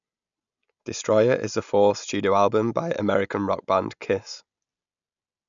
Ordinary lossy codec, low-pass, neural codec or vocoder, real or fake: none; 7.2 kHz; none; real